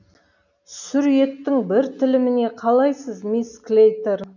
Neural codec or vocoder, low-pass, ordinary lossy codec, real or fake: none; 7.2 kHz; none; real